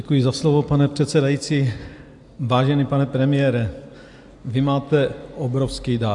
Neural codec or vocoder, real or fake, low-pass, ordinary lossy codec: none; real; 10.8 kHz; AAC, 64 kbps